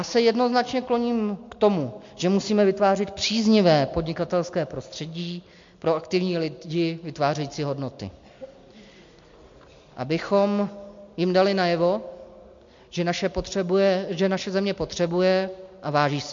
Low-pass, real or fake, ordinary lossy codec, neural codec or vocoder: 7.2 kHz; real; MP3, 48 kbps; none